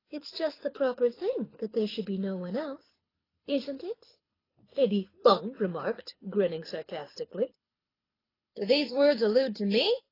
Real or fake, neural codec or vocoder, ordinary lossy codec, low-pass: fake; codec, 24 kHz, 6 kbps, HILCodec; AAC, 24 kbps; 5.4 kHz